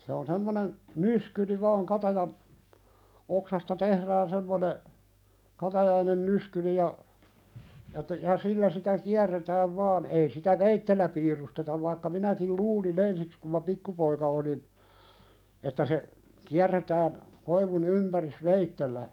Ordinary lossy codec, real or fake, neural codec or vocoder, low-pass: none; fake; codec, 44.1 kHz, 7.8 kbps, DAC; 19.8 kHz